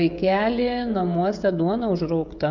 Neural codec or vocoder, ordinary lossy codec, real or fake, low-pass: codec, 16 kHz, 16 kbps, FreqCodec, smaller model; MP3, 64 kbps; fake; 7.2 kHz